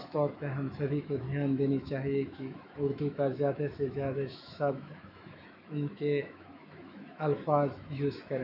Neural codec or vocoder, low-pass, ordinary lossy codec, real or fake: autoencoder, 48 kHz, 128 numbers a frame, DAC-VAE, trained on Japanese speech; 5.4 kHz; none; fake